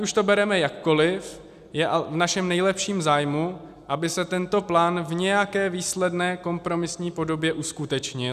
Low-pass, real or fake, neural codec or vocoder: 14.4 kHz; real; none